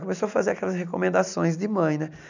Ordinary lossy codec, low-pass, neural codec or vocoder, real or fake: none; 7.2 kHz; none; real